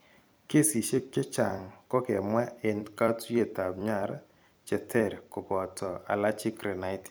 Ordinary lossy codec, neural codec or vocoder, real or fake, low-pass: none; vocoder, 44.1 kHz, 128 mel bands every 256 samples, BigVGAN v2; fake; none